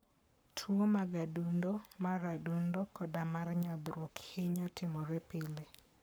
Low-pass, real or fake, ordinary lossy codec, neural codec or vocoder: none; fake; none; codec, 44.1 kHz, 7.8 kbps, Pupu-Codec